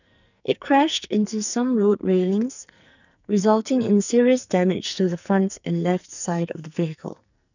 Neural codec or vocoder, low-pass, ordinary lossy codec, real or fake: codec, 44.1 kHz, 2.6 kbps, SNAC; 7.2 kHz; none; fake